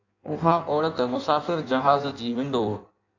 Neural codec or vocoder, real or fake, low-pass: codec, 16 kHz in and 24 kHz out, 1.1 kbps, FireRedTTS-2 codec; fake; 7.2 kHz